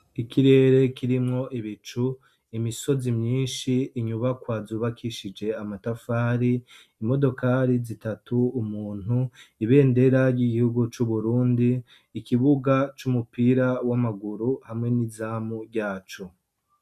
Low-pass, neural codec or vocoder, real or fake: 14.4 kHz; none; real